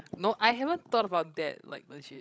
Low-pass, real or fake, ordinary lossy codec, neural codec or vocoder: none; fake; none; codec, 16 kHz, 16 kbps, FreqCodec, larger model